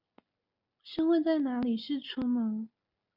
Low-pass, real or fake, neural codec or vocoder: 5.4 kHz; real; none